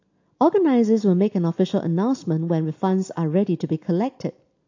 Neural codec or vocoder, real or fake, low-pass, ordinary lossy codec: none; real; 7.2 kHz; AAC, 48 kbps